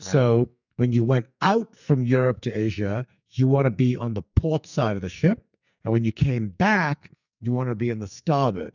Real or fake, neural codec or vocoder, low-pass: fake; codec, 44.1 kHz, 2.6 kbps, SNAC; 7.2 kHz